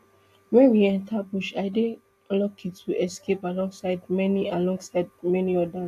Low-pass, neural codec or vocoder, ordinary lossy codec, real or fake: 14.4 kHz; none; AAC, 64 kbps; real